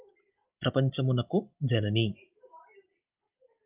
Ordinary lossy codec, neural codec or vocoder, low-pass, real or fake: Opus, 32 kbps; none; 3.6 kHz; real